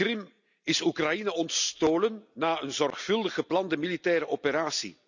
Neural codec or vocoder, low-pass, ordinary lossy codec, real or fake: none; 7.2 kHz; none; real